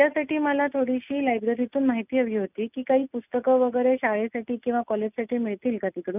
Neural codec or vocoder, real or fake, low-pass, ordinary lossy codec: none; real; 3.6 kHz; none